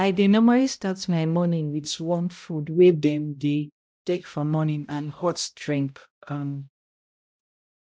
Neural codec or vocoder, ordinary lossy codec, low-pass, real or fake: codec, 16 kHz, 0.5 kbps, X-Codec, HuBERT features, trained on balanced general audio; none; none; fake